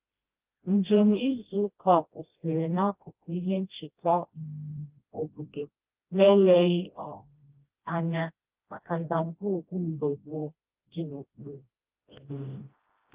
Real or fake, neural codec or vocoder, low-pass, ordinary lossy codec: fake; codec, 16 kHz, 1 kbps, FreqCodec, smaller model; 3.6 kHz; Opus, 32 kbps